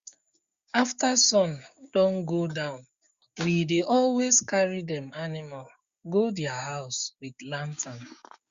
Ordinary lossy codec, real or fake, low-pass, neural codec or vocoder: Opus, 64 kbps; fake; 7.2 kHz; codec, 16 kHz, 6 kbps, DAC